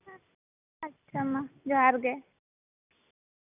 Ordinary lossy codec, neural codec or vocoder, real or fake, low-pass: none; none; real; 3.6 kHz